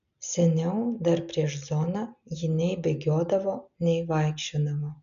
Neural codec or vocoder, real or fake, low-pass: none; real; 7.2 kHz